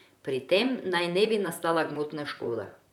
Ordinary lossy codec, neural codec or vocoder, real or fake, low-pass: none; vocoder, 44.1 kHz, 128 mel bands, Pupu-Vocoder; fake; 19.8 kHz